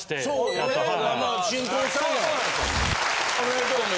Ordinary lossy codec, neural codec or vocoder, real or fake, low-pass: none; none; real; none